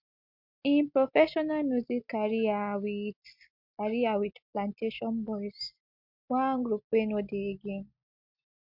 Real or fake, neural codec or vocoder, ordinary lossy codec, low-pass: real; none; MP3, 48 kbps; 5.4 kHz